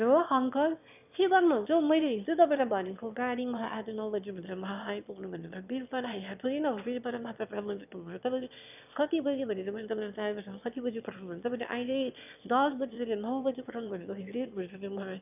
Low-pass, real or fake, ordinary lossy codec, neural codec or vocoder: 3.6 kHz; fake; none; autoencoder, 22.05 kHz, a latent of 192 numbers a frame, VITS, trained on one speaker